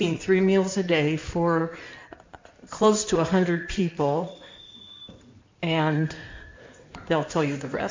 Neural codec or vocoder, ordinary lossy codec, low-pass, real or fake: codec, 16 kHz in and 24 kHz out, 2.2 kbps, FireRedTTS-2 codec; MP3, 64 kbps; 7.2 kHz; fake